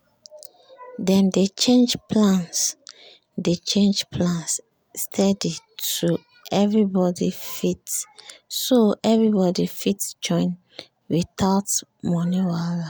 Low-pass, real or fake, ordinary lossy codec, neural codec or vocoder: none; real; none; none